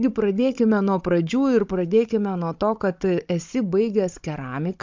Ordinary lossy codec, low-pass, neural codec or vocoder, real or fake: MP3, 64 kbps; 7.2 kHz; codec, 16 kHz, 16 kbps, FunCodec, trained on Chinese and English, 50 frames a second; fake